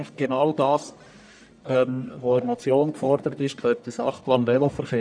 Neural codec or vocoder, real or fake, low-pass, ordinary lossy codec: codec, 44.1 kHz, 1.7 kbps, Pupu-Codec; fake; 9.9 kHz; none